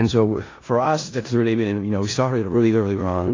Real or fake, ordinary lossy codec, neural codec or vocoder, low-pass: fake; AAC, 32 kbps; codec, 16 kHz in and 24 kHz out, 0.4 kbps, LongCat-Audio-Codec, four codebook decoder; 7.2 kHz